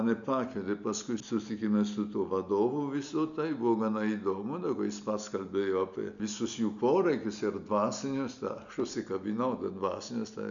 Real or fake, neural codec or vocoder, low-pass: real; none; 7.2 kHz